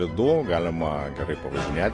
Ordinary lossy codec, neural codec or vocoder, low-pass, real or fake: AAC, 32 kbps; none; 10.8 kHz; real